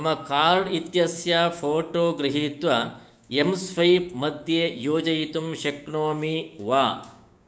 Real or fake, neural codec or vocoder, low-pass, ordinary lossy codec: fake; codec, 16 kHz, 6 kbps, DAC; none; none